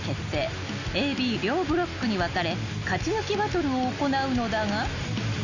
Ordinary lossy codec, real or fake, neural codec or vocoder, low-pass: none; real; none; 7.2 kHz